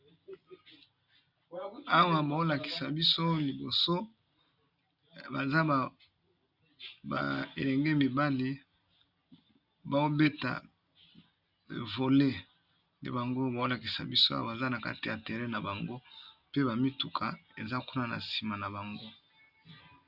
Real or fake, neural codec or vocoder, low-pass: real; none; 5.4 kHz